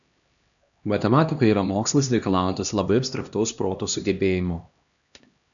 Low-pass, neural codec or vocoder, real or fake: 7.2 kHz; codec, 16 kHz, 1 kbps, X-Codec, HuBERT features, trained on LibriSpeech; fake